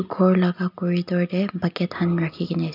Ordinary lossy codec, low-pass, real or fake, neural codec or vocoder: none; 5.4 kHz; real; none